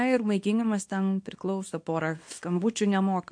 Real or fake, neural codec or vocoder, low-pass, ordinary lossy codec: fake; codec, 24 kHz, 0.9 kbps, WavTokenizer, small release; 9.9 kHz; MP3, 48 kbps